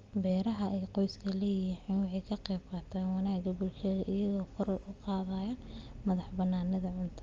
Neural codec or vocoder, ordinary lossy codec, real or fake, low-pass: none; Opus, 32 kbps; real; 7.2 kHz